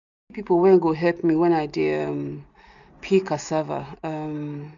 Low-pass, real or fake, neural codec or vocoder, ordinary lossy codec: 7.2 kHz; real; none; none